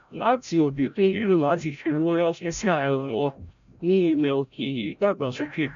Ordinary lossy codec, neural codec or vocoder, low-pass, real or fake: AAC, 96 kbps; codec, 16 kHz, 0.5 kbps, FreqCodec, larger model; 7.2 kHz; fake